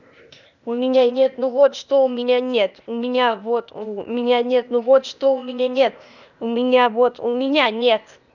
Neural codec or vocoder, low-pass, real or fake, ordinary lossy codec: codec, 16 kHz, 0.8 kbps, ZipCodec; 7.2 kHz; fake; none